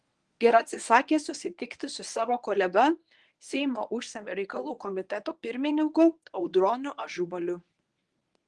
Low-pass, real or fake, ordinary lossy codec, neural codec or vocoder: 10.8 kHz; fake; Opus, 24 kbps; codec, 24 kHz, 0.9 kbps, WavTokenizer, medium speech release version 1